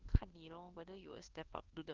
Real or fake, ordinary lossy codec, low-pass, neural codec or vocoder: fake; Opus, 16 kbps; 7.2 kHz; codec, 24 kHz, 1.2 kbps, DualCodec